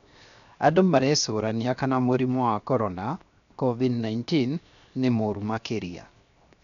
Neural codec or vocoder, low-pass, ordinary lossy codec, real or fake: codec, 16 kHz, 0.7 kbps, FocalCodec; 7.2 kHz; none; fake